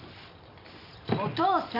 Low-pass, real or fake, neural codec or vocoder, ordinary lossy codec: 5.4 kHz; real; none; none